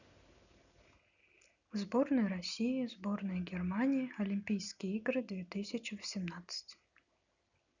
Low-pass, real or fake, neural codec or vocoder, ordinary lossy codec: 7.2 kHz; real; none; none